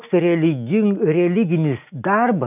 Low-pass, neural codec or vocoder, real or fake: 3.6 kHz; none; real